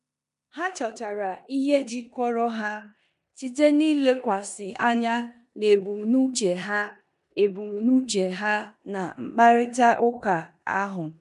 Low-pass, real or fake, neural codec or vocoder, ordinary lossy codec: 10.8 kHz; fake; codec, 16 kHz in and 24 kHz out, 0.9 kbps, LongCat-Audio-Codec, four codebook decoder; none